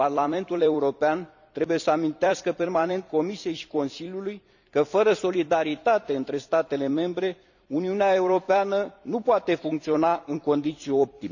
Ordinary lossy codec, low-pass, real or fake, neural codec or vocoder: none; 7.2 kHz; fake; vocoder, 44.1 kHz, 128 mel bands every 256 samples, BigVGAN v2